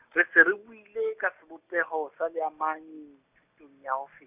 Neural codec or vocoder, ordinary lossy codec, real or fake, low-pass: none; none; real; 3.6 kHz